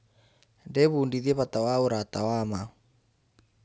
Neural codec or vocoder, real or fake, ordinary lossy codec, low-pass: none; real; none; none